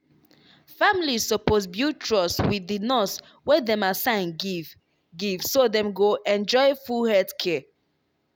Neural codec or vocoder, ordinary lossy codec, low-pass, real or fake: none; none; none; real